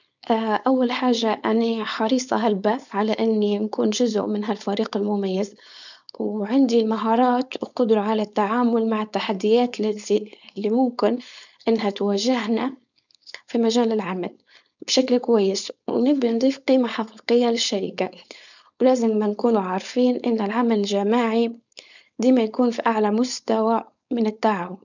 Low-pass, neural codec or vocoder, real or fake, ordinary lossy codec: 7.2 kHz; codec, 16 kHz, 4.8 kbps, FACodec; fake; none